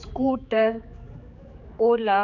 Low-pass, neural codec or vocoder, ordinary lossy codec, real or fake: 7.2 kHz; codec, 16 kHz, 4 kbps, X-Codec, HuBERT features, trained on general audio; none; fake